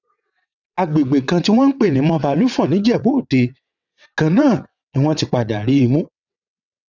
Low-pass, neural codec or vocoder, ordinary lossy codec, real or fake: 7.2 kHz; vocoder, 22.05 kHz, 80 mel bands, WaveNeXt; none; fake